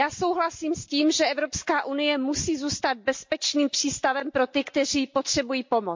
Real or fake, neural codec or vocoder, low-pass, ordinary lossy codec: real; none; 7.2 kHz; MP3, 48 kbps